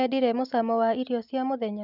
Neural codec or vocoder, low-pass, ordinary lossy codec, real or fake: none; 5.4 kHz; none; real